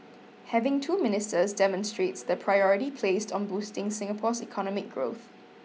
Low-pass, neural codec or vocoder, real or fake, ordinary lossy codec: none; none; real; none